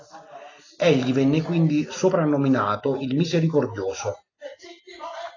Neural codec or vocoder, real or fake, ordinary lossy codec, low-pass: none; real; AAC, 32 kbps; 7.2 kHz